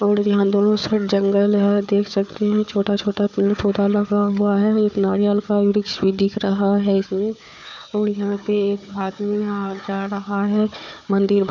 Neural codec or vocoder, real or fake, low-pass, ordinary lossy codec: codec, 16 kHz, 4 kbps, FunCodec, trained on Chinese and English, 50 frames a second; fake; 7.2 kHz; none